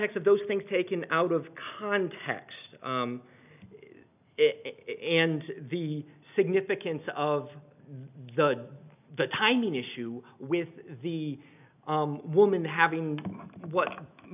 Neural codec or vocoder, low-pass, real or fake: none; 3.6 kHz; real